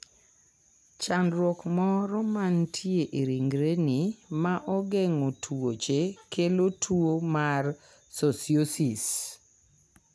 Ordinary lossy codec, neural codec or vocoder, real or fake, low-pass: none; none; real; none